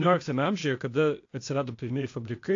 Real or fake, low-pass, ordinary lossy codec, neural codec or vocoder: fake; 7.2 kHz; AAC, 48 kbps; codec, 16 kHz, 0.8 kbps, ZipCodec